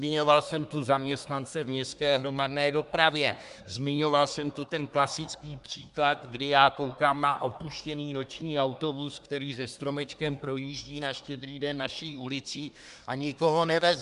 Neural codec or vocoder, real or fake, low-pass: codec, 24 kHz, 1 kbps, SNAC; fake; 10.8 kHz